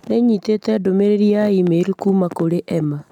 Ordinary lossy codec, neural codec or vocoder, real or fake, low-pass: none; none; real; 19.8 kHz